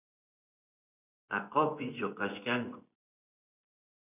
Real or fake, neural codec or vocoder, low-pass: fake; vocoder, 24 kHz, 100 mel bands, Vocos; 3.6 kHz